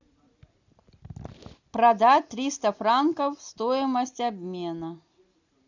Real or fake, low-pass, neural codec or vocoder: real; 7.2 kHz; none